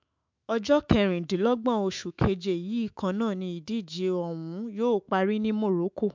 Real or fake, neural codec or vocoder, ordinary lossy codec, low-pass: fake; autoencoder, 48 kHz, 128 numbers a frame, DAC-VAE, trained on Japanese speech; MP3, 64 kbps; 7.2 kHz